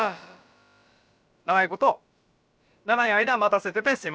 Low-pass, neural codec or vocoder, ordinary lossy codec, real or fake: none; codec, 16 kHz, about 1 kbps, DyCAST, with the encoder's durations; none; fake